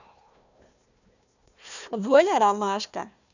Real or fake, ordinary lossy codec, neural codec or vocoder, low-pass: fake; none; codec, 16 kHz, 1 kbps, FunCodec, trained on Chinese and English, 50 frames a second; 7.2 kHz